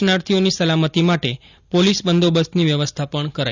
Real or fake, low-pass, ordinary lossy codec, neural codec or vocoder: real; 7.2 kHz; none; none